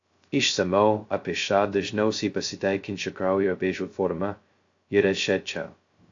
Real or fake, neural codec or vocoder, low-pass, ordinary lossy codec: fake; codec, 16 kHz, 0.2 kbps, FocalCodec; 7.2 kHz; AAC, 48 kbps